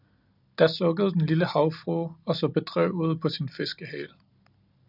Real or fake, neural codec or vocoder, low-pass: real; none; 5.4 kHz